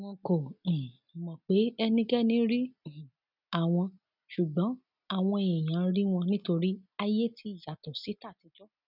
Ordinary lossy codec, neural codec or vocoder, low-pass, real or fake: none; none; 5.4 kHz; real